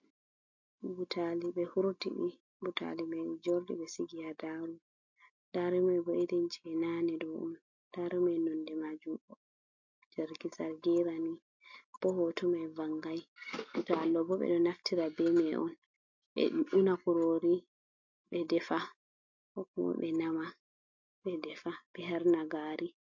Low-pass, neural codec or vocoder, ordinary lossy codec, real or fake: 7.2 kHz; none; MP3, 48 kbps; real